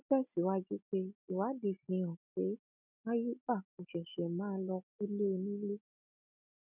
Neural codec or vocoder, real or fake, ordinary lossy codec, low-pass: none; real; none; 3.6 kHz